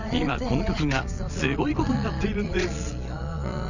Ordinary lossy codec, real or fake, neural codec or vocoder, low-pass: none; fake; vocoder, 22.05 kHz, 80 mel bands, Vocos; 7.2 kHz